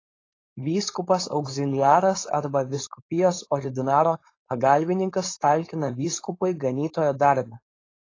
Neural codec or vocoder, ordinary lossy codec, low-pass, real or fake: codec, 16 kHz, 4.8 kbps, FACodec; AAC, 32 kbps; 7.2 kHz; fake